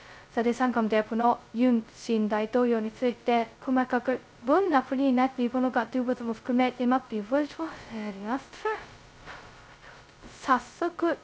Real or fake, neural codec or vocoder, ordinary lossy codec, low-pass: fake; codec, 16 kHz, 0.2 kbps, FocalCodec; none; none